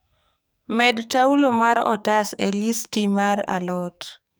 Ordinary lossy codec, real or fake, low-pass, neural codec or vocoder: none; fake; none; codec, 44.1 kHz, 2.6 kbps, SNAC